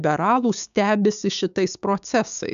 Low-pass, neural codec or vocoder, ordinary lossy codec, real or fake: 7.2 kHz; codec, 16 kHz, 6 kbps, DAC; AAC, 96 kbps; fake